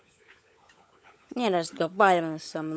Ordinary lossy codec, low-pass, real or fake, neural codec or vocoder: none; none; fake; codec, 16 kHz, 16 kbps, FunCodec, trained on LibriTTS, 50 frames a second